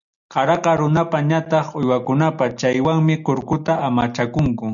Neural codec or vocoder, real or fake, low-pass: none; real; 7.2 kHz